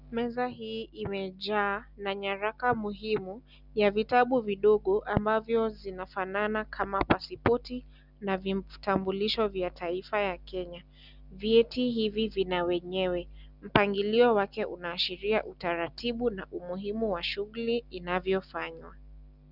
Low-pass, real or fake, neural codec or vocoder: 5.4 kHz; real; none